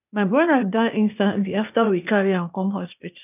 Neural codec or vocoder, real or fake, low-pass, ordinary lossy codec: codec, 16 kHz, 0.8 kbps, ZipCodec; fake; 3.6 kHz; none